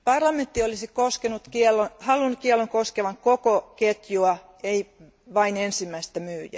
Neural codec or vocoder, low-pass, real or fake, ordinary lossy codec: none; none; real; none